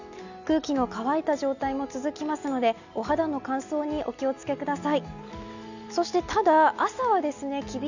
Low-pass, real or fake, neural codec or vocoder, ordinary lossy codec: 7.2 kHz; real; none; none